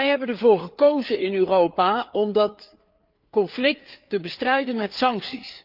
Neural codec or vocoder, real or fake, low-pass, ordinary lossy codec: codec, 16 kHz, 4 kbps, FreqCodec, larger model; fake; 5.4 kHz; Opus, 24 kbps